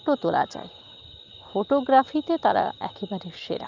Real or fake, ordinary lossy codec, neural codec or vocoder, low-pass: real; Opus, 24 kbps; none; 7.2 kHz